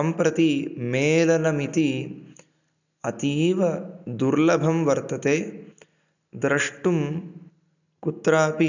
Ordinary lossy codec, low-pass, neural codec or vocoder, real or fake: none; 7.2 kHz; none; real